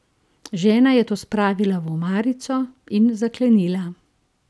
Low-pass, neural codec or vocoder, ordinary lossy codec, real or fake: none; none; none; real